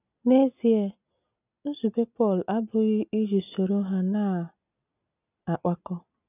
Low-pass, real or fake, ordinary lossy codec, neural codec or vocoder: 3.6 kHz; real; none; none